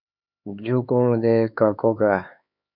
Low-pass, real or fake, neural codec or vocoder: 5.4 kHz; fake; codec, 16 kHz, 4 kbps, X-Codec, HuBERT features, trained on LibriSpeech